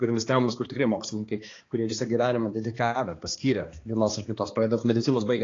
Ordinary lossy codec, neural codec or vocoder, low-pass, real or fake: AAC, 32 kbps; codec, 16 kHz, 2 kbps, X-Codec, HuBERT features, trained on balanced general audio; 7.2 kHz; fake